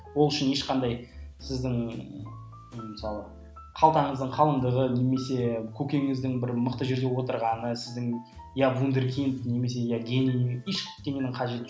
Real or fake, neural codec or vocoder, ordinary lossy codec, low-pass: real; none; none; none